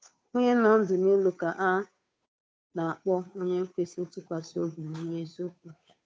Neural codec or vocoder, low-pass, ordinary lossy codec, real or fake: codec, 16 kHz, 2 kbps, FunCodec, trained on Chinese and English, 25 frames a second; none; none; fake